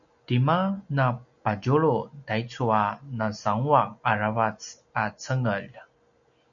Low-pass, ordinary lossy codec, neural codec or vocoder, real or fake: 7.2 kHz; MP3, 96 kbps; none; real